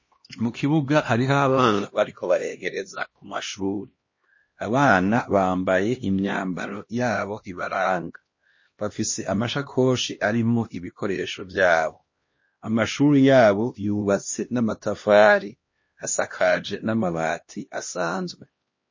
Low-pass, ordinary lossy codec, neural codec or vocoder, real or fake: 7.2 kHz; MP3, 32 kbps; codec, 16 kHz, 1 kbps, X-Codec, HuBERT features, trained on LibriSpeech; fake